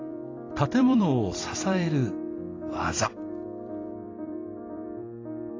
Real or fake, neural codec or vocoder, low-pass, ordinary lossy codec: fake; vocoder, 44.1 kHz, 128 mel bands every 256 samples, BigVGAN v2; 7.2 kHz; AAC, 32 kbps